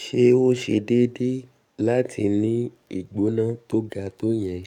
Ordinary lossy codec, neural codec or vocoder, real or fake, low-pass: none; codec, 44.1 kHz, 7.8 kbps, DAC; fake; 19.8 kHz